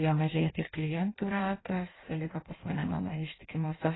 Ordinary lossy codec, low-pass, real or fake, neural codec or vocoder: AAC, 16 kbps; 7.2 kHz; fake; codec, 16 kHz in and 24 kHz out, 0.6 kbps, FireRedTTS-2 codec